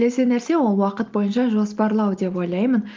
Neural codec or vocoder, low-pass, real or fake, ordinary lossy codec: none; 7.2 kHz; real; Opus, 32 kbps